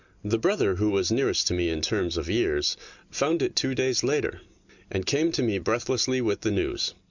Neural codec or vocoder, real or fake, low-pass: none; real; 7.2 kHz